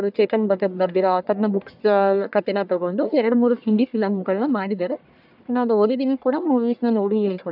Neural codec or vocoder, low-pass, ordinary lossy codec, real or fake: codec, 44.1 kHz, 1.7 kbps, Pupu-Codec; 5.4 kHz; none; fake